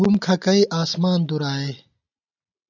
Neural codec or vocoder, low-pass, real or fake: none; 7.2 kHz; real